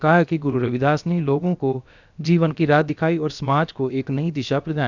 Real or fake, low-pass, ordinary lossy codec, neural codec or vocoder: fake; 7.2 kHz; none; codec, 16 kHz, about 1 kbps, DyCAST, with the encoder's durations